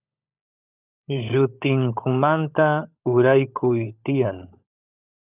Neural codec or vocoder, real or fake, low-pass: codec, 16 kHz, 16 kbps, FunCodec, trained on LibriTTS, 50 frames a second; fake; 3.6 kHz